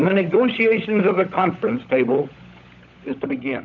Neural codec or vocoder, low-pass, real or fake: codec, 16 kHz, 16 kbps, FunCodec, trained on LibriTTS, 50 frames a second; 7.2 kHz; fake